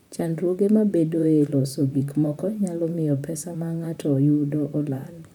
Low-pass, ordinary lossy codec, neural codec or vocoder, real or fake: 19.8 kHz; none; vocoder, 44.1 kHz, 128 mel bands, Pupu-Vocoder; fake